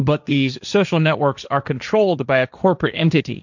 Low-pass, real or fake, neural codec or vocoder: 7.2 kHz; fake; codec, 16 kHz, 1.1 kbps, Voila-Tokenizer